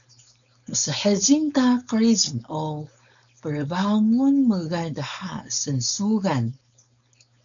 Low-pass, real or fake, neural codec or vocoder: 7.2 kHz; fake; codec, 16 kHz, 4.8 kbps, FACodec